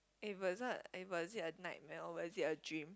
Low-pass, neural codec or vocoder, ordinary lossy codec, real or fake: none; none; none; real